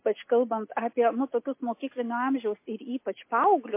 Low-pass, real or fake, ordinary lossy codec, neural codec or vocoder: 3.6 kHz; real; MP3, 24 kbps; none